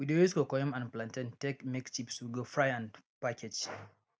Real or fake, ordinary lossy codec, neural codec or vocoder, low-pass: real; none; none; none